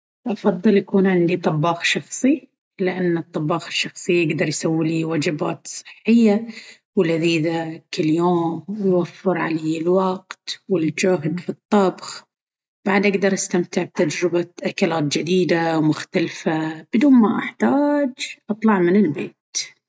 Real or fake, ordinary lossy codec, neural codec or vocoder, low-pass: real; none; none; none